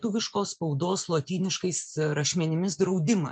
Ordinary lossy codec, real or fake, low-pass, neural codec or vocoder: MP3, 96 kbps; fake; 9.9 kHz; vocoder, 24 kHz, 100 mel bands, Vocos